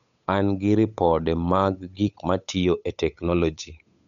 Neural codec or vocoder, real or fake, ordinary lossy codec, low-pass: codec, 16 kHz, 8 kbps, FunCodec, trained on Chinese and English, 25 frames a second; fake; none; 7.2 kHz